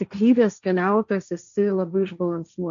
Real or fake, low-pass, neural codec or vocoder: fake; 7.2 kHz; codec, 16 kHz, 1.1 kbps, Voila-Tokenizer